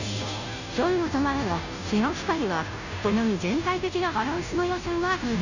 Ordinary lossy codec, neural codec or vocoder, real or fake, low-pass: none; codec, 16 kHz, 0.5 kbps, FunCodec, trained on Chinese and English, 25 frames a second; fake; 7.2 kHz